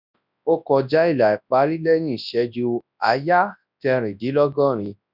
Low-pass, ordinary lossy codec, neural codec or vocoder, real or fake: 5.4 kHz; none; codec, 24 kHz, 0.9 kbps, WavTokenizer, large speech release; fake